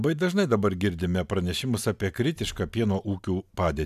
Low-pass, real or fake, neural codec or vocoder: 14.4 kHz; real; none